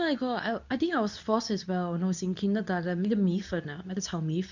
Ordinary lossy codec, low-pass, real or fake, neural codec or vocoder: none; 7.2 kHz; fake; codec, 24 kHz, 0.9 kbps, WavTokenizer, medium speech release version 2